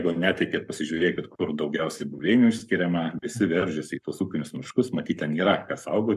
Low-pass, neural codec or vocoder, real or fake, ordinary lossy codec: 14.4 kHz; codec, 44.1 kHz, 7.8 kbps, Pupu-Codec; fake; MP3, 96 kbps